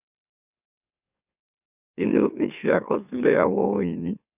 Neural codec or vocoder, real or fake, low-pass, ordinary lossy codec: autoencoder, 44.1 kHz, a latent of 192 numbers a frame, MeloTTS; fake; 3.6 kHz; none